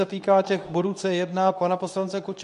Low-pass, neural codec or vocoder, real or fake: 10.8 kHz; codec, 24 kHz, 0.9 kbps, WavTokenizer, medium speech release version 2; fake